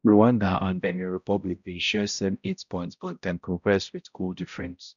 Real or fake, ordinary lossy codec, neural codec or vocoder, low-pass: fake; none; codec, 16 kHz, 0.5 kbps, X-Codec, HuBERT features, trained on balanced general audio; 7.2 kHz